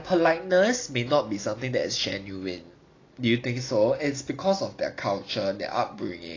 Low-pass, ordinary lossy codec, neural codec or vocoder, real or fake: 7.2 kHz; AAC, 32 kbps; none; real